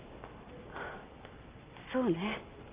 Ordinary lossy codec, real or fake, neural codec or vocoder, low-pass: Opus, 24 kbps; real; none; 3.6 kHz